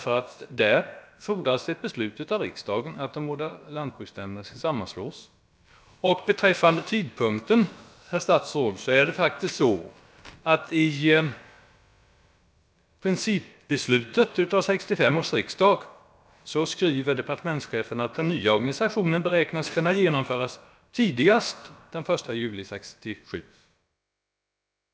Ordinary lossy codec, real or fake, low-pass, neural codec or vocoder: none; fake; none; codec, 16 kHz, about 1 kbps, DyCAST, with the encoder's durations